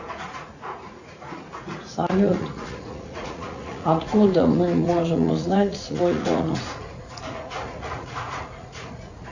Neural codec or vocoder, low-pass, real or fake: vocoder, 44.1 kHz, 80 mel bands, Vocos; 7.2 kHz; fake